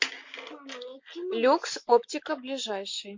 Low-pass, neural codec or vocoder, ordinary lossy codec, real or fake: 7.2 kHz; none; MP3, 48 kbps; real